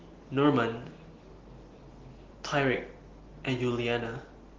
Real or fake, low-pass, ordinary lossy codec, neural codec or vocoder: real; 7.2 kHz; Opus, 16 kbps; none